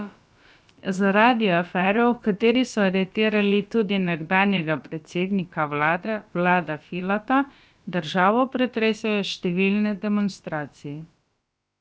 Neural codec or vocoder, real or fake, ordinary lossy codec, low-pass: codec, 16 kHz, about 1 kbps, DyCAST, with the encoder's durations; fake; none; none